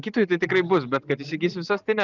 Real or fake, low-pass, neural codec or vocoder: real; 7.2 kHz; none